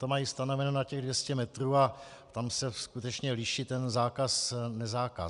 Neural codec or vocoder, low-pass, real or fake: none; 10.8 kHz; real